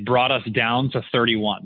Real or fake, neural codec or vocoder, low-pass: real; none; 5.4 kHz